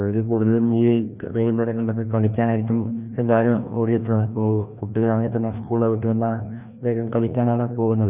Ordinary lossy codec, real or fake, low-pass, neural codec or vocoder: none; fake; 3.6 kHz; codec, 16 kHz, 1 kbps, FreqCodec, larger model